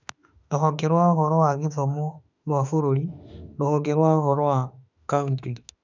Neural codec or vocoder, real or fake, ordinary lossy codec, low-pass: autoencoder, 48 kHz, 32 numbers a frame, DAC-VAE, trained on Japanese speech; fake; none; 7.2 kHz